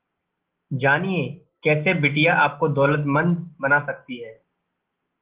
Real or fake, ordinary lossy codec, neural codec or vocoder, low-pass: real; Opus, 16 kbps; none; 3.6 kHz